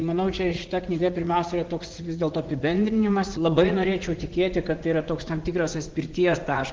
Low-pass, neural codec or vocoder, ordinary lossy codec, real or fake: 7.2 kHz; vocoder, 44.1 kHz, 80 mel bands, Vocos; Opus, 16 kbps; fake